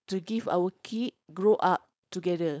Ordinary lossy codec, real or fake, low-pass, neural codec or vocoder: none; fake; none; codec, 16 kHz, 4.8 kbps, FACodec